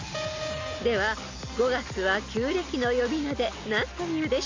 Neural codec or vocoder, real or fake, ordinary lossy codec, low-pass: none; real; MP3, 64 kbps; 7.2 kHz